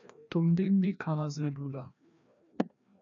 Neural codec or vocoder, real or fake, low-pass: codec, 16 kHz, 1 kbps, FreqCodec, larger model; fake; 7.2 kHz